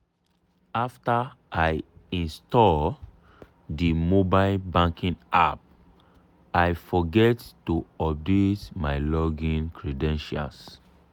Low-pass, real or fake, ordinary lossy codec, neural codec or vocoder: 19.8 kHz; real; none; none